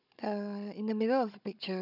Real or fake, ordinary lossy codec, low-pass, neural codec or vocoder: fake; none; 5.4 kHz; codec, 16 kHz, 16 kbps, FunCodec, trained on Chinese and English, 50 frames a second